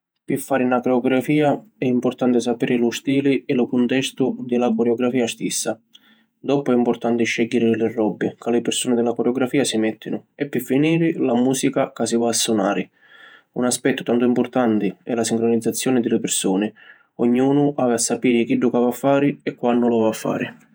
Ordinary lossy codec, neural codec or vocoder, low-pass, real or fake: none; vocoder, 48 kHz, 128 mel bands, Vocos; none; fake